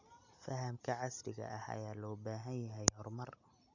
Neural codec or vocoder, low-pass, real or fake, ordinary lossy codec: none; 7.2 kHz; real; none